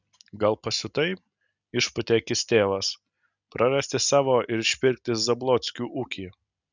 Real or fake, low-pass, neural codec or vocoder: real; 7.2 kHz; none